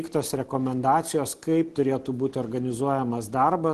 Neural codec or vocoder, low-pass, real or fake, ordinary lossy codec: none; 10.8 kHz; real; Opus, 16 kbps